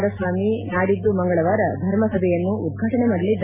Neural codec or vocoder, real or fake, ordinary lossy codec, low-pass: none; real; MP3, 24 kbps; 3.6 kHz